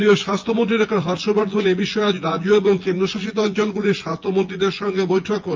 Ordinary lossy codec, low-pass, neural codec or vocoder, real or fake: Opus, 24 kbps; 7.2 kHz; vocoder, 24 kHz, 100 mel bands, Vocos; fake